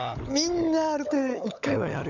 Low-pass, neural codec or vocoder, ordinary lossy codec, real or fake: 7.2 kHz; codec, 16 kHz, 16 kbps, FunCodec, trained on LibriTTS, 50 frames a second; none; fake